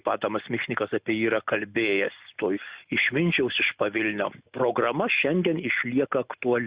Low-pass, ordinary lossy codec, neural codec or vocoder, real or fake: 3.6 kHz; Opus, 64 kbps; none; real